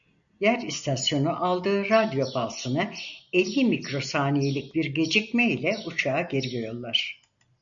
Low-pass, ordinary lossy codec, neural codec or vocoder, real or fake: 7.2 kHz; MP3, 96 kbps; none; real